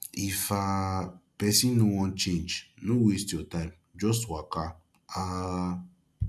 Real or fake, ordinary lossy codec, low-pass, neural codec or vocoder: real; none; none; none